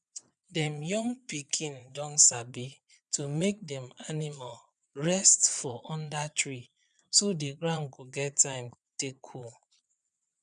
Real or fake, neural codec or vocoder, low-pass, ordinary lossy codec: fake; vocoder, 22.05 kHz, 80 mel bands, Vocos; 9.9 kHz; none